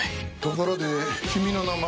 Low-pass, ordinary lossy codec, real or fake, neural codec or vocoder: none; none; real; none